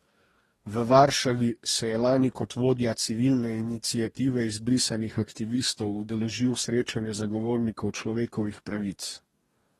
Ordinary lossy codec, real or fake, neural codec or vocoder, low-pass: AAC, 32 kbps; fake; codec, 44.1 kHz, 2.6 kbps, DAC; 19.8 kHz